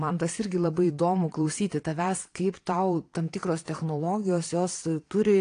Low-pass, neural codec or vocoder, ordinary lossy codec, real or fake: 9.9 kHz; vocoder, 22.05 kHz, 80 mel bands, Vocos; AAC, 48 kbps; fake